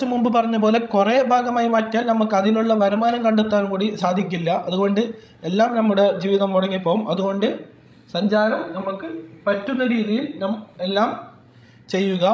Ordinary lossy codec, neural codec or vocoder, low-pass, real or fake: none; codec, 16 kHz, 16 kbps, FreqCodec, larger model; none; fake